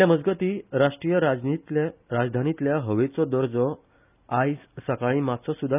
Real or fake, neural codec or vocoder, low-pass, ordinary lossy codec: real; none; 3.6 kHz; none